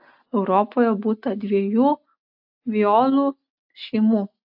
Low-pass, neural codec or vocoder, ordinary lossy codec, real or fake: 5.4 kHz; none; AAC, 48 kbps; real